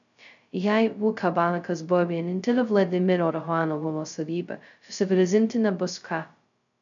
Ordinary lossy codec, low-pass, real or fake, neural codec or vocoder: MP3, 96 kbps; 7.2 kHz; fake; codec, 16 kHz, 0.2 kbps, FocalCodec